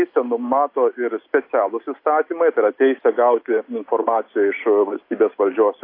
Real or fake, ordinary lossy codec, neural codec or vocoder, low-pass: real; AAC, 32 kbps; none; 5.4 kHz